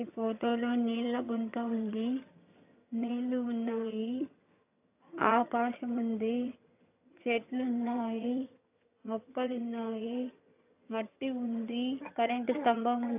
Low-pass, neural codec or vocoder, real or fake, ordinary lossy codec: 3.6 kHz; vocoder, 22.05 kHz, 80 mel bands, HiFi-GAN; fake; AAC, 32 kbps